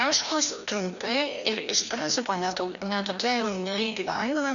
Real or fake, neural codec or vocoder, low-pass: fake; codec, 16 kHz, 1 kbps, FreqCodec, larger model; 7.2 kHz